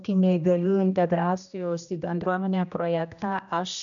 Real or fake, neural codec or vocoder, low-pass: fake; codec, 16 kHz, 1 kbps, X-Codec, HuBERT features, trained on general audio; 7.2 kHz